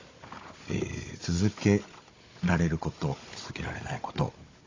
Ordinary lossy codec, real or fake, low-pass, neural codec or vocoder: AAC, 32 kbps; fake; 7.2 kHz; codec, 16 kHz, 16 kbps, FunCodec, trained on Chinese and English, 50 frames a second